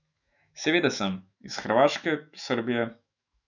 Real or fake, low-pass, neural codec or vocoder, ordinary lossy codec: fake; 7.2 kHz; autoencoder, 48 kHz, 128 numbers a frame, DAC-VAE, trained on Japanese speech; none